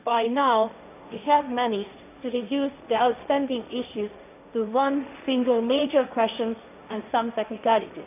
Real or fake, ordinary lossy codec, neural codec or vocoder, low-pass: fake; none; codec, 16 kHz, 1.1 kbps, Voila-Tokenizer; 3.6 kHz